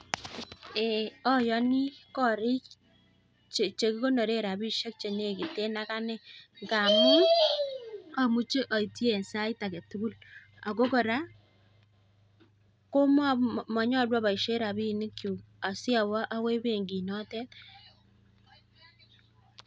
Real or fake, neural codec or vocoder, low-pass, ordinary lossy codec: real; none; none; none